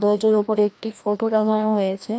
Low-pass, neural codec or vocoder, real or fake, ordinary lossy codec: none; codec, 16 kHz, 1 kbps, FreqCodec, larger model; fake; none